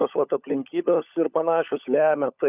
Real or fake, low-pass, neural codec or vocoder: fake; 3.6 kHz; codec, 16 kHz, 16 kbps, FunCodec, trained on LibriTTS, 50 frames a second